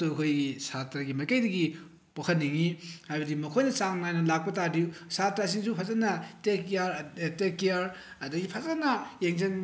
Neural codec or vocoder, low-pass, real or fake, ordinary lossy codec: none; none; real; none